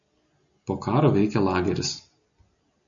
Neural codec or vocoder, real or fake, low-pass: none; real; 7.2 kHz